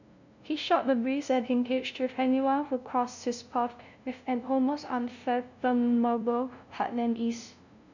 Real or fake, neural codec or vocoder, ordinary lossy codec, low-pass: fake; codec, 16 kHz, 0.5 kbps, FunCodec, trained on LibriTTS, 25 frames a second; none; 7.2 kHz